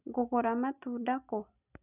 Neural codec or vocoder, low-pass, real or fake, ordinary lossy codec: none; 3.6 kHz; real; none